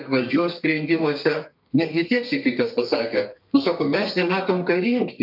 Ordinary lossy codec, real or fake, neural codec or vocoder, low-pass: AAC, 48 kbps; fake; codec, 32 kHz, 1.9 kbps, SNAC; 5.4 kHz